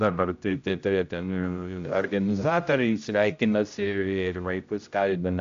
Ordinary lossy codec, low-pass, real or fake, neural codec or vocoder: AAC, 64 kbps; 7.2 kHz; fake; codec, 16 kHz, 0.5 kbps, X-Codec, HuBERT features, trained on general audio